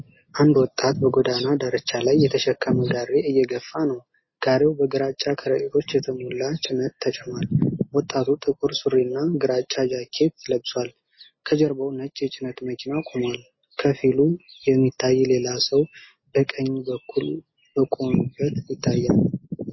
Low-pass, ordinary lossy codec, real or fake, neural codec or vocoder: 7.2 kHz; MP3, 24 kbps; real; none